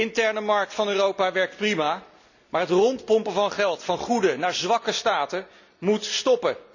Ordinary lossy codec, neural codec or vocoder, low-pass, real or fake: none; none; 7.2 kHz; real